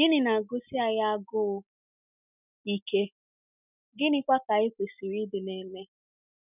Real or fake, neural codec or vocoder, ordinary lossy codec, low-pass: real; none; none; 3.6 kHz